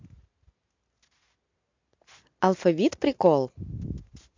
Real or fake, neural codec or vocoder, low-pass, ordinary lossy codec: real; none; 7.2 kHz; MP3, 48 kbps